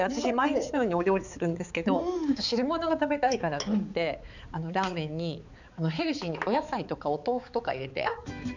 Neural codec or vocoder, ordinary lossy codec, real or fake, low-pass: codec, 16 kHz, 4 kbps, X-Codec, HuBERT features, trained on balanced general audio; none; fake; 7.2 kHz